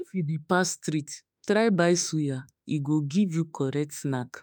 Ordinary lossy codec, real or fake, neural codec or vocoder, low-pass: none; fake; autoencoder, 48 kHz, 32 numbers a frame, DAC-VAE, trained on Japanese speech; none